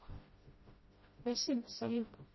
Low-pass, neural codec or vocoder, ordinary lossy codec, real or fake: 7.2 kHz; codec, 16 kHz, 0.5 kbps, FreqCodec, smaller model; MP3, 24 kbps; fake